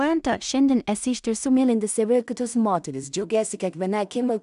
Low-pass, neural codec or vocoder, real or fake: 10.8 kHz; codec, 16 kHz in and 24 kHz out, 0.4 kbps, LongCat-Audio-Codec, two codebook decoder; fake